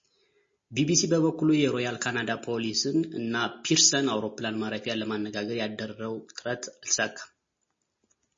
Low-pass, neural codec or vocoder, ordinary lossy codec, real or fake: 7.2 kHz; none; MP3, 32 kbps; real